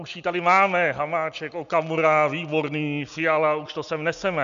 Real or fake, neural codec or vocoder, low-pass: real; none; 7.2 kHz